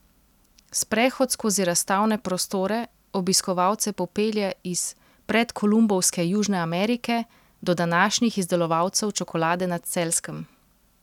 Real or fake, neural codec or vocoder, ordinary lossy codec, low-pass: real; none; none; 19.8 kHz